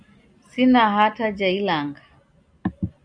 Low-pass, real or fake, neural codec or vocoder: 9.9 kHz; real; none